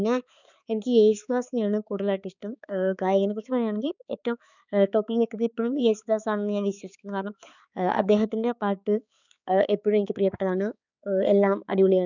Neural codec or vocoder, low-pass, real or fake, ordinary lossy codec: codec, 16 kHz, 4 kbps, X-Codec, HuBERT features, trained on balanced general audio; 7.2 kHz; fake; none